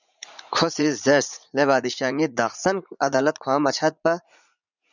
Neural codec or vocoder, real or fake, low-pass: vocoder, 44.1 kHz, 80 mel bands, Vocos; fake; 7.2 kHz